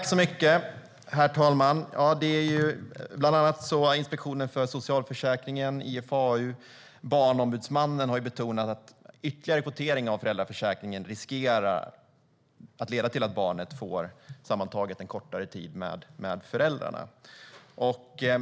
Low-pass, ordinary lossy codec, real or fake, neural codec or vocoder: none; none; real; none